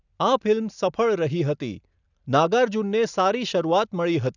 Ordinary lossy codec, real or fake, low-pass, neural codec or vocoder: none; real; 7.2 kHz; none